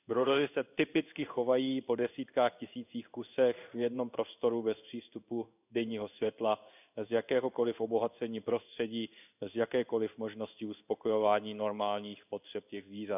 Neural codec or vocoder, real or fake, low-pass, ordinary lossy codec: codec, 16 kHz in and 24 kHz out, 1 kbps, XY-Tokenizer; fake; 3.6 kHz; none